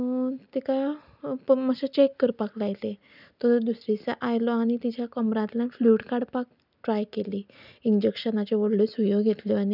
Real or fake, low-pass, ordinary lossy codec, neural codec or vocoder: real; 5.4 kHz; none; none